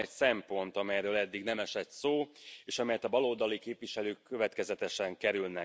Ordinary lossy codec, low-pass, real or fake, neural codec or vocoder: none; none; real; none